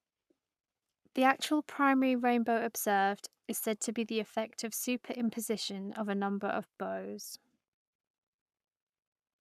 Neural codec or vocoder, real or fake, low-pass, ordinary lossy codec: codec, 44.1 kHz, 7.8 kbps, Pupu-Codec; fake; 14.4 kHz; none